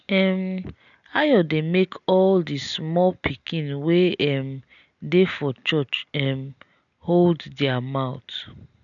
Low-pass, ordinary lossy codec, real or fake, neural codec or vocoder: 7.2 kHz; none; real; none